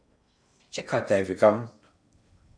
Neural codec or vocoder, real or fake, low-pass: codec, 16 kHz in and 24 kHz out, 0.6 kbps, FocalCodec, streaming, 2048 codes; fake; 9.9 kHz